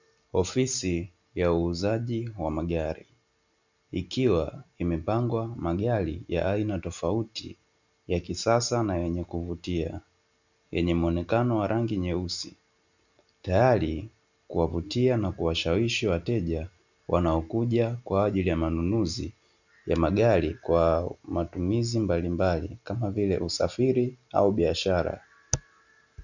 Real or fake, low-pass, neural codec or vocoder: real; 7.2 kHz; none